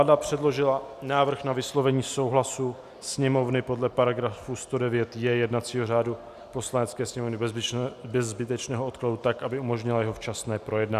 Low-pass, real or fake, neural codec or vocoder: 14.4 kHz; real; none